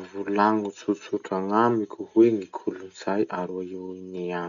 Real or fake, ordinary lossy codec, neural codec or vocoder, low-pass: real; MP3, 96 kbps; none; 7.2 kHz